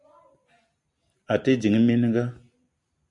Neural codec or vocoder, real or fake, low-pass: none; real; 10.8 kHz